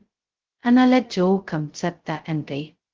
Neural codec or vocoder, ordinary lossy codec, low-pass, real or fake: codec, 16 kHz, 0.2 kbps, FocalCodec; Opus, 16 kbps; 7.2 kHz; fake